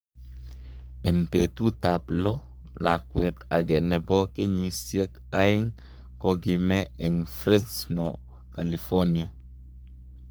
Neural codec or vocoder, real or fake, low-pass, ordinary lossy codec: codec, 44.1 kHz, 3.4 kbps, Pupu-Codec; fake; none; none